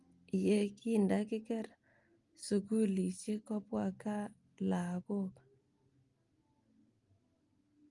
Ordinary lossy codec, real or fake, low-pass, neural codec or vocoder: Opus, 32 kbps; real; 10.8 kHz; none